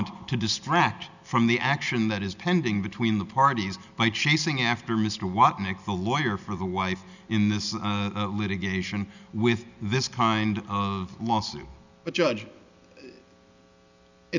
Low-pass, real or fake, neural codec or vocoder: 7.2 kHz; real; none